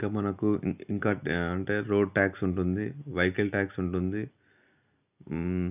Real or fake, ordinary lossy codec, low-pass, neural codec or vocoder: real; none; 3.6 kHz; none